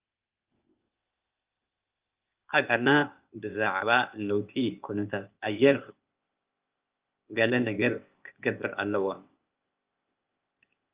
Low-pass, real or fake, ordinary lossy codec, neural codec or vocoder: 3.6 kHz; fake; Opus, 32 kbps; codec, 16 kHz, 0.8 kbps, ZipCodec